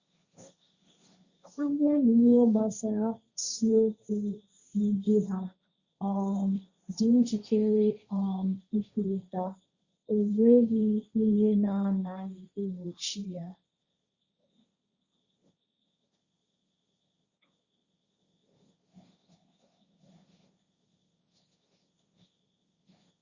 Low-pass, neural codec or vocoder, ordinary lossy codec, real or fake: 7.2 kHz; codec, 16 kHz, 1.1 kbps, Voila-Tokenizer; Opus, 64 kbps; fake